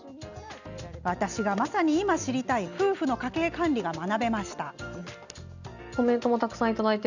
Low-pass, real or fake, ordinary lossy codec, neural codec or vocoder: 7.2 kHz; real; none; none